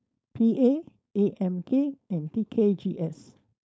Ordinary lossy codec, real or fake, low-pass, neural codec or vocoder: none; fake; none; codec, 16 kHz, 4.8 kbps, FACodec